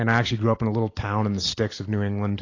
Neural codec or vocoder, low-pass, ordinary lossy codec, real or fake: none; 7.2 kHz; AAC, 32 kbps; real